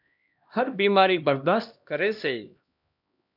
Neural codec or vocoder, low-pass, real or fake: codec, 16 kHz, 1 kbps, X-Codec, HuBERT features, trained on LibriSpeech; 5.4 kHz; fake